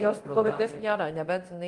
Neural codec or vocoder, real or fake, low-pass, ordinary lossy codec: codec, 24 kHz, 0.9 kbps, DualCodec; fake; 10.8 kHz; Opus, 24 kbps